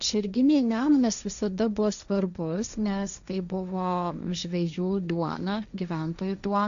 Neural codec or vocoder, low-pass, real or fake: codec, 16 kHz, 1.1 kbps, Voila-Tokenizer; 7.2 kHz; fake